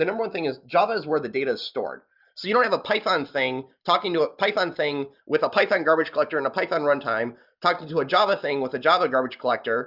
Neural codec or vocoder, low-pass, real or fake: none; 5.4 kHz; real